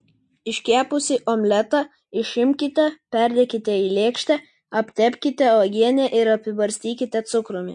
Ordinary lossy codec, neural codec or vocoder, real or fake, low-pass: MP3, 48 kbps; none; real; 9.9 kHz